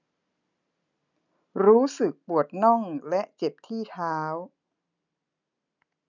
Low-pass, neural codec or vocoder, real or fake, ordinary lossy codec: 7.2 kHz; none; real; none